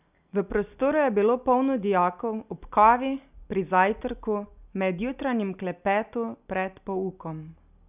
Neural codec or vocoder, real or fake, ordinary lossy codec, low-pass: none; real; none; 3.6 kHz